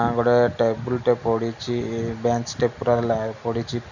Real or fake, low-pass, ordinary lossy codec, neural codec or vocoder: real; 7.2 kHz; none; none